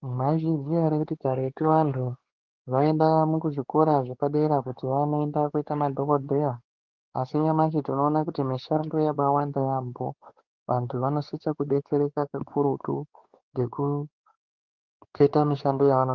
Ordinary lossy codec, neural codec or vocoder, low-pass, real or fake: Opus, 16 kbps; codec, 16 kHz, 4 kbps, X-Codec, WavLM features, trained on Multilingual LibriSpeech; 7.2 kHz; fake